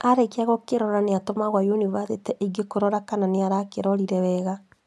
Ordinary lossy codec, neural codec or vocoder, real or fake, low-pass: none; none; real; none